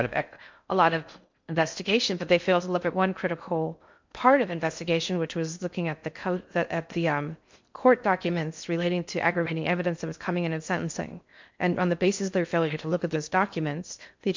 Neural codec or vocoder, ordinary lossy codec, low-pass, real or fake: codec, 16 kHz in and 24 kHz out, 0.6 kbps, FocalCodec, streaming, 4096 codes; MP3, 64 kbps; 7.2 kHz; fake